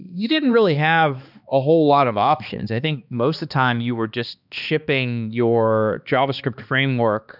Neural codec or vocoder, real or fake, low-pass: codec, 16 kHz, 2 kbps, X-Codec, HuBERT features, trained on balanced general audio; fake; 5.4 kHz